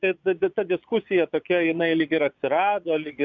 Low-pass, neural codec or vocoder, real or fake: 7.2 kHz; vocoder, 22.05 kHz, 80 mel bands, Vocos; fake